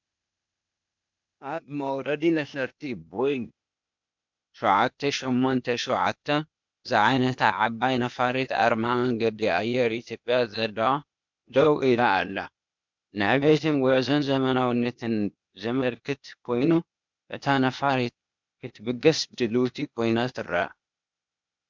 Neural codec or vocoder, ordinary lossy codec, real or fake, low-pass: codec, 16 kHz, 0.8 kbps, ZipCodec; MP3, 64 kbps; fake; 7.2 kHz